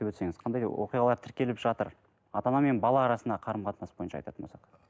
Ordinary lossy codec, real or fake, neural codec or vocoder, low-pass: none; real; none; none